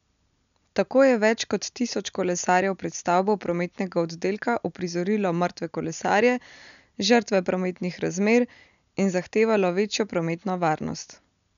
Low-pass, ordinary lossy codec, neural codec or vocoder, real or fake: 7.2 kHz; none; none; real